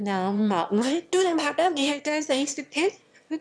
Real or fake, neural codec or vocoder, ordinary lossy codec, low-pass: fake; autoencoder, 22.05 kHz, a latent of 192 numbers a frame, VITS, trained on one speaker; none; none